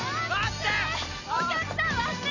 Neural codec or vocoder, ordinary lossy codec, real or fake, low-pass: none; none; real; 7.2 kHz